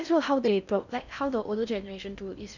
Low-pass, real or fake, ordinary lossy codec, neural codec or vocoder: 7.2 kHz; fake; none; codec, 16 kHz in and 24 kHz out, 0.6 kbps, FocalCodec, streaming, 4096 codes